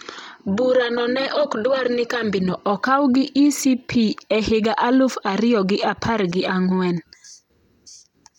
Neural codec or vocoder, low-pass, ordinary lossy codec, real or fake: none; 19.8 kHz; none; real